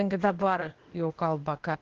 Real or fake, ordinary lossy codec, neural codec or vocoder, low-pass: fake; Opus, 16 kbps; codec, 16 kHz, 0.8 kbps, ZipCodec; 7.2 kHz